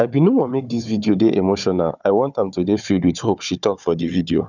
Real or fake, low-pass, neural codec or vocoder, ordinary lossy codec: fake; 7.2 kHz; codec, 16 kHz, 4 kbps, FunCodec, trained on Chinese and English, 50 frames a second; none